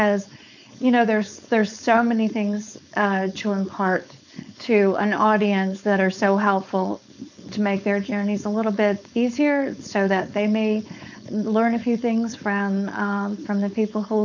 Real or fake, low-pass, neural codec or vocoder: fake; 7.2 kHz; codec, 16 kHz, 4.8 kbps, FACodec